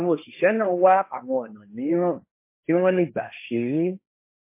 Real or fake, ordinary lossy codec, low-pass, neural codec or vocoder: fake; MP3, 24 kbps; 3.6 kHz; codec, 16 kHz, 1.1 kbps, Voila-Tokenizer